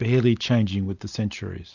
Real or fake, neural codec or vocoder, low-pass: real; none; 7.2 kHz